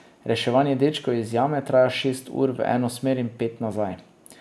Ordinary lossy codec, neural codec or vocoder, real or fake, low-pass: none; none; real; none